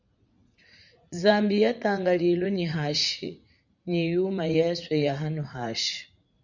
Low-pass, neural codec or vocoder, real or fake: 7.2 kHz; vocoder, 44.1 kHz, 80 mel bands, Vocos; fake